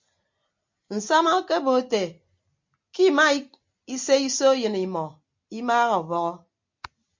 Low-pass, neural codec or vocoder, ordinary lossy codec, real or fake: 7.2 kHz; none; MP3, 48 kbps; real